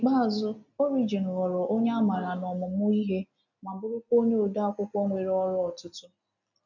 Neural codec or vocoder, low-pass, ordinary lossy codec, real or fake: none; 7.2 kHz; none; real